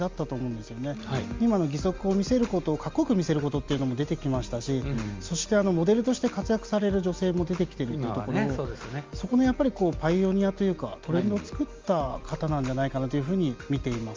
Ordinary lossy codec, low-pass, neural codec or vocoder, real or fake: Opus, 32 kbps; 7.2 kHz; none; real